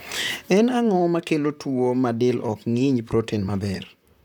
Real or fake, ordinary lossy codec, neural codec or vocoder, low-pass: fake; none; vocoder, 44.1 kHz, 128 mel bands, Pupu-Vocoder; none